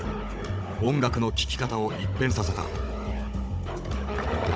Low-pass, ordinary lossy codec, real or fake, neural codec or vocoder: none; none; fake; codec, 16 kHz, 16 kbps, FunCodec, trained on Chinese and English, 50 frames a second